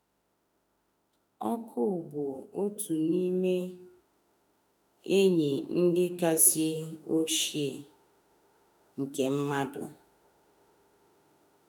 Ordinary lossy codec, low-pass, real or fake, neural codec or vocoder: none; none; fake; autoencoder, 48 kHz, 32 numbers a frame, DAC-VAE, trained on Japanese speech